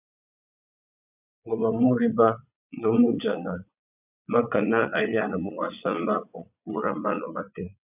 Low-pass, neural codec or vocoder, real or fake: 3.6 kHz; vocoder, 22.05 kHz, 80 mel bands, Vocos; fake